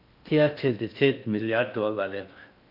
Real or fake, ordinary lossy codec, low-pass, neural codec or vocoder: fake; none; 5.4 kHz; codec, 16 kHz in and 24 kHz out, 0.6 kbps, FocalCodec, streaming, 4096 codes